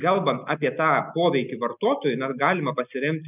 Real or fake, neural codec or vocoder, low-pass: real; none; 3.6 kHz